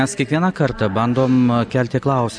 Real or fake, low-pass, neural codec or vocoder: real; 9.9 kHz; none